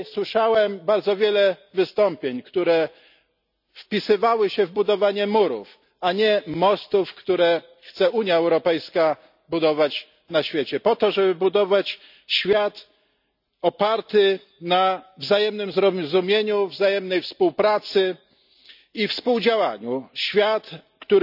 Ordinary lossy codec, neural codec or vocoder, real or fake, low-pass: none; none; real; 5.4 kHz